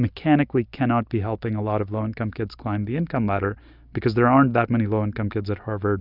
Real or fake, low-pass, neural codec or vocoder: real; 5.4 kHz; none